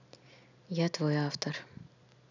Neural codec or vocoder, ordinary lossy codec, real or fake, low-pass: none; none; real; 7.2 kHz